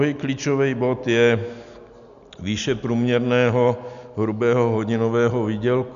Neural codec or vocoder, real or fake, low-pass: none; real; 7.2 kHz